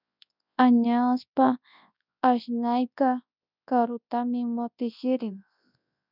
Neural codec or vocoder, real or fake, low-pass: codec, 24 kHz, 0.9 kbps, WavTokenizer, large speech release; fake; 5.4 kHz